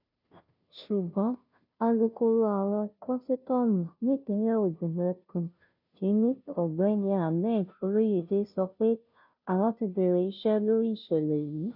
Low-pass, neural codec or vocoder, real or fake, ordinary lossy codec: 5.4 kHz; codec, 16 kHz, 0.5 kbps, FunCodec, trained on Chinese and English, 25 frames a second; fake; none